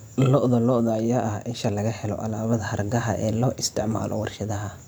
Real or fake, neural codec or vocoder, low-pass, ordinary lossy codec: fake; vocoder, 44.1 kHz, 128 mel bands every 512 samples, BigVGAN v2; none; none